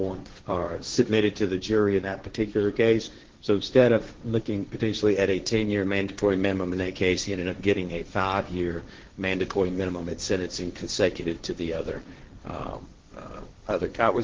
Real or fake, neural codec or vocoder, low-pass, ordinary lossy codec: fake; codec, 16 kHz, 1.1 kbps, Voila-Tokenizer; 7.2 kHz; Opus, 16 kbps